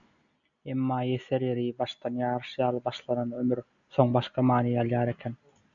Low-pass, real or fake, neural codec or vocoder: 7.2 kHz; real; none